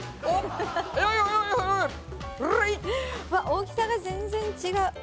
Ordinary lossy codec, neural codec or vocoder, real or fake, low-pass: none; none; real; none